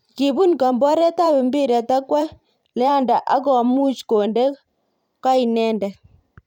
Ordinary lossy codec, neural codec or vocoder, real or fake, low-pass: none; vocoder, 44.1 kHz, 128 mel bands every 512 samples, BigVGAN v2; fake; 19.8 kHz